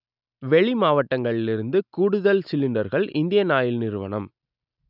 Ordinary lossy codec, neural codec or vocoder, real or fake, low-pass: none; none; real; 5.4 kHz